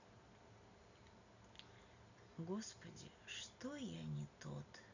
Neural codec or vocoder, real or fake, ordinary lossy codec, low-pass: none; real; none; 7.2 kHz